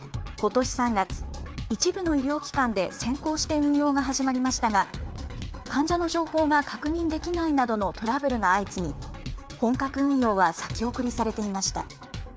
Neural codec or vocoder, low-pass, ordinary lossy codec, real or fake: codec, 16 kHz, 4 kbps, FreqCodec, larger model; none; none; fake